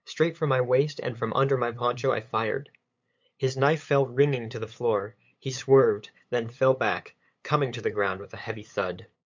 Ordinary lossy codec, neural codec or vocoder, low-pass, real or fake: MP3, 64 kbps; codec, 16 kHz, 8 kbps, FunCodec, trained on LibriTTS, 25 frames a second; 7.2 kHz; fake